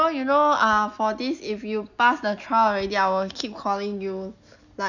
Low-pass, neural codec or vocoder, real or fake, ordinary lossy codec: 7.2 kHz; none; real; none